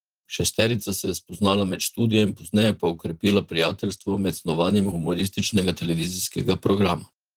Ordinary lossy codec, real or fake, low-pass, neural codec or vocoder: Opus, 24 kbps; fake; 19.8 kHz; vocoder, 44.1 kHz, 128 mel bands, Pupu-Vocoder